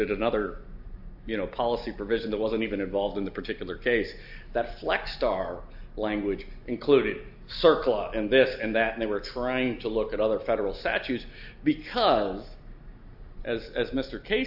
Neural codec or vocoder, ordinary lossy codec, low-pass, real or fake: none; AAC, 48 kbps; 5.4 kHz; real